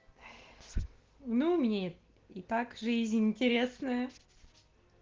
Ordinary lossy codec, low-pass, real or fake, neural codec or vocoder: Opus, 16 kbps; 7.2 kHz; real; none